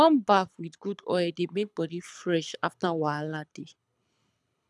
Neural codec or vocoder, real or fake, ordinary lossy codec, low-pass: codec, 24 kHz, 6 kbps, HILCodec; fake; none; none